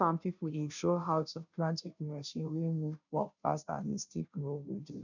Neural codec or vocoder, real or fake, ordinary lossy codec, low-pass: codec, 16 kHz, 0.5 kbps, FunCodec, trained on Chinese and English, 25 frames a second; fake; none; 7.2 kHz